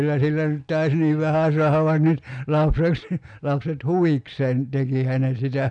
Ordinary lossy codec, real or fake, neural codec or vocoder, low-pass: none; real; none; 9.9 kHz